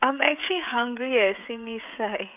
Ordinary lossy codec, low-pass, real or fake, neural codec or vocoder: none; 3.6 kHz; fake; codec, 16 kHz, 16 kbps, FreqCodec, smaller model